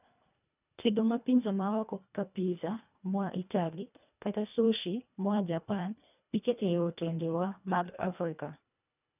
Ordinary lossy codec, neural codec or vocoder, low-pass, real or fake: none; codec, 24 kHz, 1.5 kbps, HILCodec; 3.6 kHz; fake